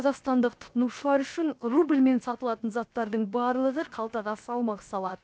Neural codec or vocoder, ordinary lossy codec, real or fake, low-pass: codec, 16 kHz, 0.7 kbps, FocalCodec; none; fake; none